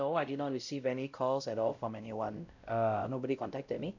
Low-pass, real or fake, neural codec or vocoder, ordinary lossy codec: 7.2 kHz; fake; codec, 16 kHz, 0.5 kbps, X-Codec, WavLM features, trained on Multilingual LibriSpeech; none